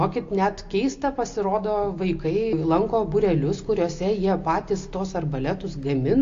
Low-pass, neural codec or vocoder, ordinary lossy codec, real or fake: 7.2 kHz; none; AAC, 48 kbps; real